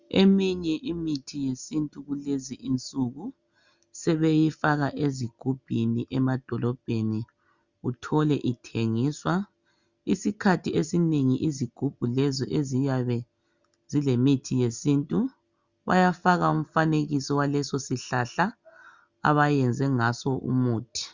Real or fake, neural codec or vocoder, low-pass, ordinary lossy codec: real; none; 7.2 kHz; Opus, 64 kbps